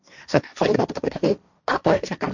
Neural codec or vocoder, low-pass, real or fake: codec, 16 kHz, 1.1 kbps, Voila-Tokenizer; 7.2 kHz; fake